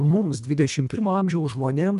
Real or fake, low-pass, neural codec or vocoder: fake; 10.8 kHz; codec, 24 kHz, 1.5 kbps, HILCodec